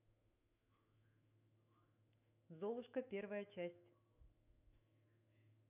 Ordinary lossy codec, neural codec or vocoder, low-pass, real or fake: none; autoencoder, 48 kHz, 128 numbers a frame, DAC-VAE, trained on Japanese speech; 3.6 kHz; fake